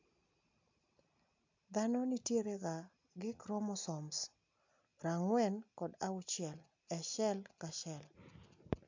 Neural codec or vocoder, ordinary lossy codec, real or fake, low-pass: none; MP3, 64 kbps; real; 7.2 kHz